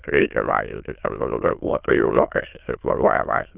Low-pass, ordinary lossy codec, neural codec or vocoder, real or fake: 3.6 kHz; Opus, 32 kbps; autoencoder, 22.05 kHz, a latent of 192 numbers a frame, VITS, trained on many speakers; fake